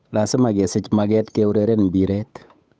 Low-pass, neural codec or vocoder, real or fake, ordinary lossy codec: none; codec, 16 kHz, 8 kbps, FunCodec, trained on Chinese and English, 25 frames a second; fake; none